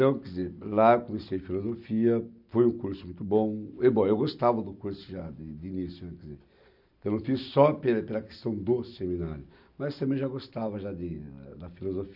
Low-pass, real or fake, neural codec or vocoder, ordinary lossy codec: 5.4 kHz; real; none; none